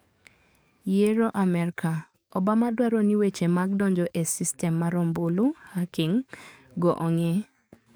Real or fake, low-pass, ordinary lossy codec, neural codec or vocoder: fake; none; none; codec, 44.1 kHz, 7.8 kbps, DAC